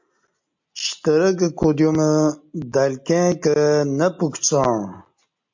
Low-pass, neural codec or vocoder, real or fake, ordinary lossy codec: 7.2 kHz; none; real; MP3, 48 kbps